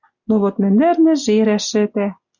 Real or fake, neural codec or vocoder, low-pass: real; none; 7.2 kHz